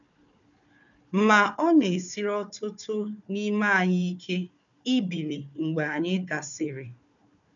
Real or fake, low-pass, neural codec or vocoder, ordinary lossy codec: fake; 7.2 kHz; codec, 16 kHz, 4 kbps, FunCodec, trained on Chinese and English, 50 frames a second; none